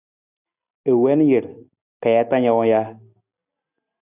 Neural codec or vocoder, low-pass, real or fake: none; 3.6 kHz; real